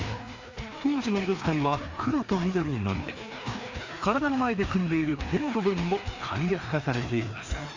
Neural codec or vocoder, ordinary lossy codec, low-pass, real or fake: codec, 16 kHz, 2 kbps, FreqCodec, larger model; MP3, 48 kbps; 7.2 kHz; fake